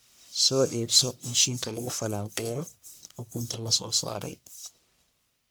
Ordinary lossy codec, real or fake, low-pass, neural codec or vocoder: none; fake; none; codec, 44.1 kHz, 1.7 kbps, Pupu-Codec